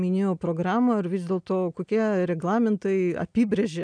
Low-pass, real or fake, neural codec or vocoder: 9.9 kHz; real; none